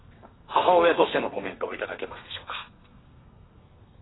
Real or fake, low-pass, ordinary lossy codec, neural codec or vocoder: fake; 7.2 kHz; AAC, 16 kbps; codec, 32 kHz, 1.9 kbps, SNAC